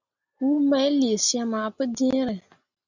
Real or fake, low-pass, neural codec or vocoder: real; 7.2 kHz; none